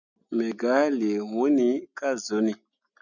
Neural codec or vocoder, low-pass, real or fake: none; 7.2 kHz; real